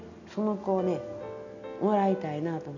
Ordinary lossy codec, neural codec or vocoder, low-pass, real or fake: none; none; 7.2 kHz; real